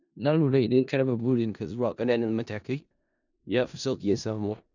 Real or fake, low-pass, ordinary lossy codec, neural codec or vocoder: fake; 7.2 kHz; none; codec, 16 kHz in and 24 kHz out, 0.4 kbps, LongCat-Audio-Codec, four codebook decoder